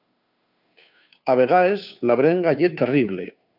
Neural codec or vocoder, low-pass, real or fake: codec, 16 kHz, 2 kbps, FunCodec, trained on Chinese and English, 25 frames a second; 5.4 kHz; fake